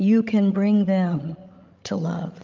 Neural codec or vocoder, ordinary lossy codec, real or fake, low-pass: codec, 16 kHz, 16 kbps, FreqCodec, larger model; Opus, 24 kbps; fake; 7.2 kHz